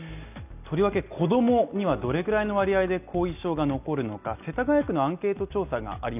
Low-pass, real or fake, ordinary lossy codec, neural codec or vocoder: 3.6 kHz; real; none; none